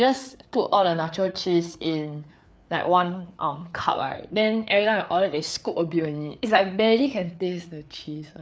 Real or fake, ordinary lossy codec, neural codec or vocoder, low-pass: fake; none; codec, 16 kHz, 4 kbps, FreqCodec, larger model; none